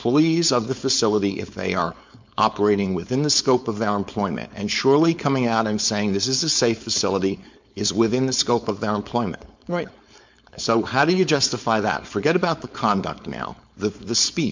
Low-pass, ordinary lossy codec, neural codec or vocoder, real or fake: 7.2 kHz; MP3, 64 kbps; codec, 16 kHz, 4.8 kbps, FACodec; fake